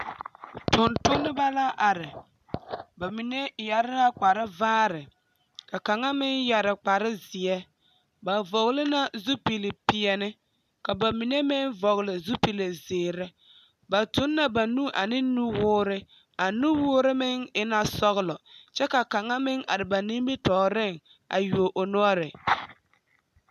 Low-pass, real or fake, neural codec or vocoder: 14.4 kHz; real; none